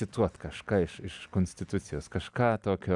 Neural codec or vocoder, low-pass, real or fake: none; 10.8 kHz; real